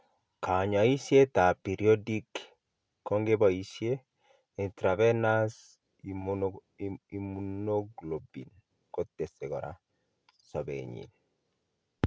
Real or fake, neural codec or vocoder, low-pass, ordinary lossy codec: real; none; none; none